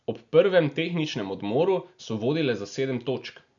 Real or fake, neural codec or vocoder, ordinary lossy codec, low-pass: real; none; none; 7.2 kHz